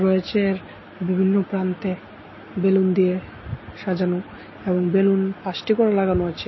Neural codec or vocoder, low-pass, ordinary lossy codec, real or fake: none; 7.2 kHz; MP3, 24 kbps; real